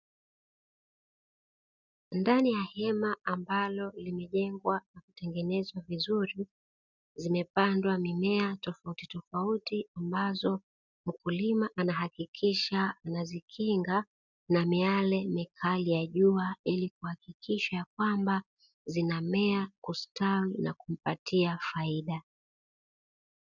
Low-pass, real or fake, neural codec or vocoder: 7.2 kHz; real; none